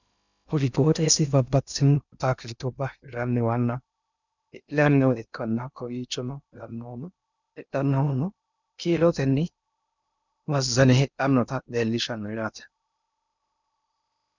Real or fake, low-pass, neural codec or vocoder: fake; 7.2 kHz; codec, 16 kHz in and 24 kHz out, 0.6 kbps, FocalCodec, streaming, 2048 codes